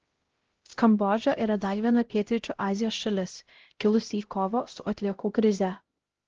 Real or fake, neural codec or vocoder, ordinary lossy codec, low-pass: fake; codec, 16 kHz, 0.5 kbps, X-Codec, HuBERT features, trained on LibriSpeech; Opus, 16 kbps; 7.2 kHz